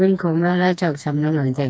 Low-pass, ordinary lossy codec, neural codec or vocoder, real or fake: none; none; codec, 16 kHz, 2 kbps, FreqCodec, smaller model; fake